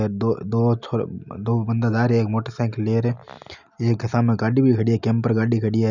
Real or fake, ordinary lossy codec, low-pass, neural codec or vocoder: real; none; 7.2 kHz; none